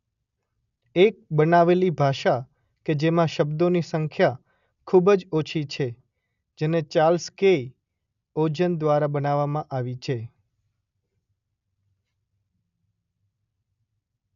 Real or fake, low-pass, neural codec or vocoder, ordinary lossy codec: real; 7.2 kHz; none; none